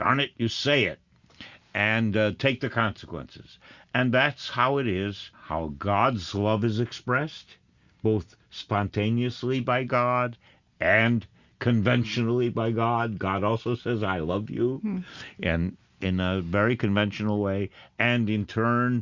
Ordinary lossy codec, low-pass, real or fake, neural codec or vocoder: Opus, 64 kbps; 7.2 kHz; real; none